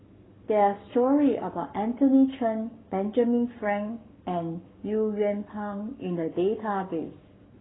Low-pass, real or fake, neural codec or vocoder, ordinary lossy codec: 7.2 kHz; fake; codec, 44.1 kHz, 7.8 kbps, Pupu-Codec; AAC, 16 kbps